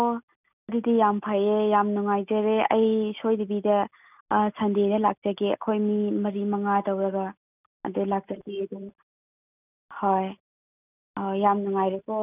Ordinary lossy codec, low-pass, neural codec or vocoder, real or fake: none; 3.6 kHz; none; real